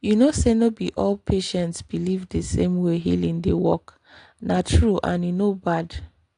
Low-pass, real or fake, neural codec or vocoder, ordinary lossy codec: 9.9 kHz; real; none; AAC, 48 kbps